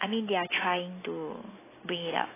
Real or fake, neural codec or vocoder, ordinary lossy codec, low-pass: real; none; AAC, 16 kbps; 3.6 kHz